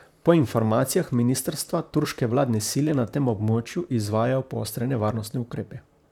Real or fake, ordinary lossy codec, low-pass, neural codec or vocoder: fake; none; 19.8 kHz; vocoder, 44.1 kHz, 128 mel bands, Pupu-Vocoder